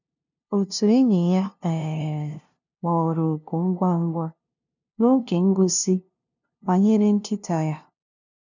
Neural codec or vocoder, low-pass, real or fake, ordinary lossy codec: codec, 16 kHz, 0.5 kbps, FunCodec, trained on LibriTTS, 25 frames a second; 7.2 kHz; fake; none